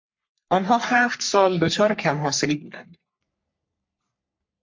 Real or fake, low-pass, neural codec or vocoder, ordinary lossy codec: fake; 7.2 kHz; codec, 44.1 kHz, 3.4 kbps, Pupu-Codec; MP3, 48 kbps